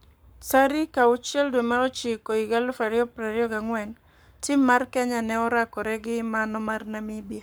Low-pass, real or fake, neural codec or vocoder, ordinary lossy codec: none; fake; codec, 44.1 kHz, 7.8 kbps, Pupu-Codec; none